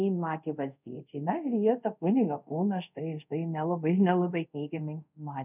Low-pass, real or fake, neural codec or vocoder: 3.6 kHz; fake; codec, 24 kHz, 0.5 kbps, DualCodec